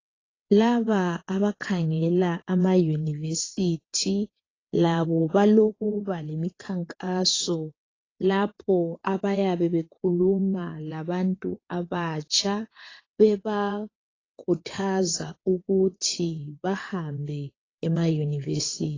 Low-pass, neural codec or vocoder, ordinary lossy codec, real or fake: 7.2 kHz; vocoder, 22.05 kHz, 80 mel bands, WaveNeXt; AAC, 32 kbps; fake